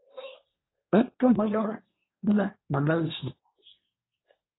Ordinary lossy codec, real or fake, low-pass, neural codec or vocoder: AAC, 16 kbps; fake; 7.2 kHz; codec, 24 kHz, 1 kbps, SNAC